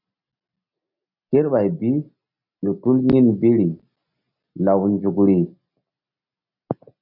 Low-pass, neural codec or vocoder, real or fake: 5.4 kHz; none; real